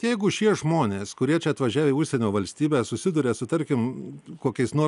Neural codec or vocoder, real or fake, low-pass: none; real; 10.8 kHz